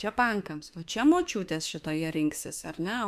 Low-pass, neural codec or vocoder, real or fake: 14.4 kHz; autoencoder, 48 kHz, 32 numbers a frame, DAC-VAE, trained on Japanese speech; fake